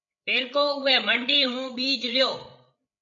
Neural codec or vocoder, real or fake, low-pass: codec, 16 kHz, 8 kbps, FreqCodec, larger model; fake; 7.2 kHz